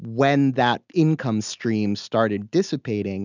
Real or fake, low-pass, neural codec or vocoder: real; 7.2 kHz; none